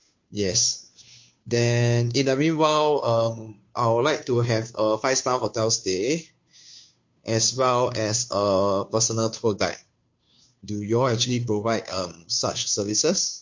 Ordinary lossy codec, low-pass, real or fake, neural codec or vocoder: MP3, 48 kbps; 7.2 kHz; fake; codec, 16 kHz, 2 kbps, FunCodec, trained on Chinese and English, 25 frames a second